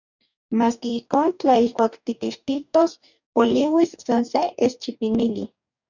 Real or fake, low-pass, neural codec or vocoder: fake; 7.2 kHz; codec, 44.1 kHz, 2.6 kbps, DAC